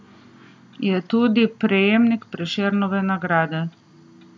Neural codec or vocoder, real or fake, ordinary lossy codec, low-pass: none; real; none; none